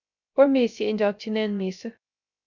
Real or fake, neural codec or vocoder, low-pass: fake; codec, 16 kHz, 0.3 kbps, FocalCodec; 7.2 kHz